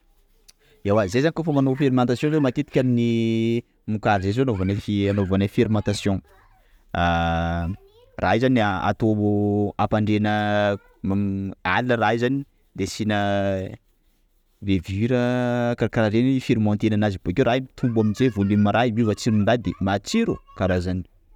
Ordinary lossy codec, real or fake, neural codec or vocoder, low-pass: none; real; none; 19.8 kHz